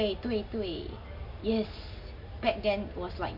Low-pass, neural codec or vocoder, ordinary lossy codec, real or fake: 5.4 kHz; none; none; real